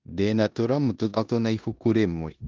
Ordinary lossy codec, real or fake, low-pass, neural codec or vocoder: Opus, 32 kbps; fake; 7.2 kHz; codec, 16 kHz in and 24 kHz out, 0.9 kbps, LongCat-Audio-Codec, four codebook decoder